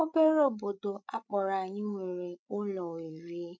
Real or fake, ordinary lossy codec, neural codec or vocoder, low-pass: fake; none; codec, 16 kHz, 16 kbps, FreqCodec, larger model; none